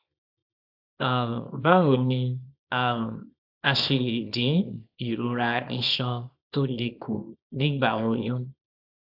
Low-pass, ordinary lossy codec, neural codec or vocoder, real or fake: 5.4 kHz; AAC, 48 kbps; codec, 24 kHz, 0.9 kbps, WavTokenizer, small release; fake